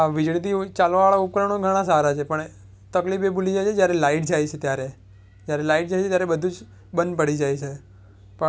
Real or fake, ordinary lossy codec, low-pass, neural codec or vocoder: real; none; none; none